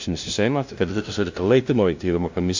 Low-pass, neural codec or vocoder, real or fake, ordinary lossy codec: 7.2 kHz; codec, 16 kHz, 0.5 kbps, FunCodec, trained on LibriTTS, 25 frames a second; fake; MP3, 48 kbps